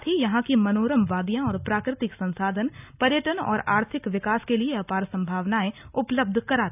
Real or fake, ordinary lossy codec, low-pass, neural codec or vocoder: real; none; 3.6 kHz; none